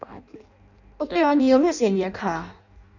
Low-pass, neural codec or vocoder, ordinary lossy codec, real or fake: 7.2 kHz; codec, 16 kHz in and 24 kHz out, 0.6 kbps, FireRedTTS-2 codec; none; fake